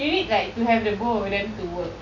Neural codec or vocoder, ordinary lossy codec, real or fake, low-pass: none; none; real; 7.2 kHz